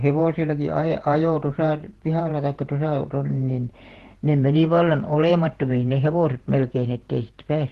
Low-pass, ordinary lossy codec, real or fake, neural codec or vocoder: 14.4 kHz; Opus, 16 kbps; fake; vocoder, 48 kHz, 128 mel bands, Vocos